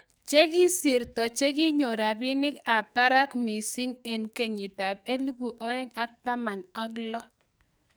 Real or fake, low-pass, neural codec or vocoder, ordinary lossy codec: fake; none; codec, 44.1 kHz, 2.6 kbps, SNAC; none